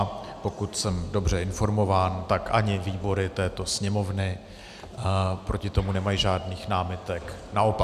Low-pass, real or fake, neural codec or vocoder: 14.4 kHz; real; none